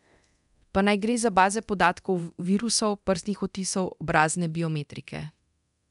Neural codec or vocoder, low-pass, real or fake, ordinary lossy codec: codec, 24 kHz, 0.9 kbps, DualCodec; 10.8 kHz; fake; none